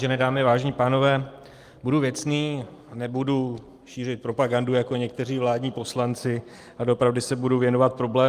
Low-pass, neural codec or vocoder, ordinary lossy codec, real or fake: 14.4 kHz; none; Opus, 32 kbps; real